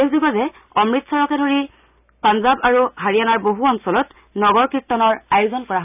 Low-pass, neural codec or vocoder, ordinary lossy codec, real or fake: 3.6 kHz; none; none; real